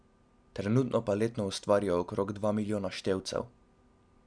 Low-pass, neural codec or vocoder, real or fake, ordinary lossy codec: 9.9 kHz; none; real; none